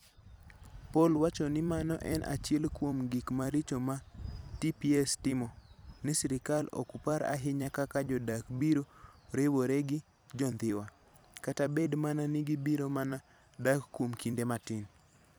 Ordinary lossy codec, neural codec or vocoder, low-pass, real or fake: none; vocoder, 44.1 kHz, 128 mel bands every 256 samples, BigVGAN v2; none; fake